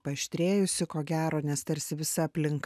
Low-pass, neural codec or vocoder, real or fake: 14.4 kHz; none; real